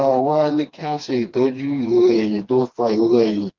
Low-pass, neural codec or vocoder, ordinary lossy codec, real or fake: 7.2 kHz; codec, 16 kHz, 2 kbps, FreqCodec, smaller model; Opus, 32 kbps; fake